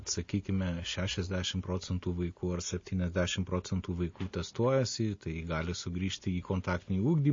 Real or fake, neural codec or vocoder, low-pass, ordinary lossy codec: real; none; 7.2 kHz; MP3, 32 kbps